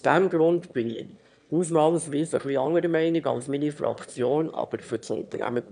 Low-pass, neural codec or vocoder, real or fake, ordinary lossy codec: 9.9 kHz; autoencoder, 22.05 kHz, a latent of 192 numbers a frame, VITS, trained on one speaker; fake; none